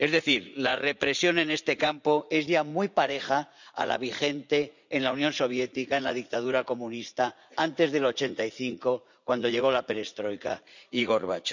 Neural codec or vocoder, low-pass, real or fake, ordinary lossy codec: vocoder, 44.1 kHz, 80 mel bands, Vocos; 7.2 kHz; fake; none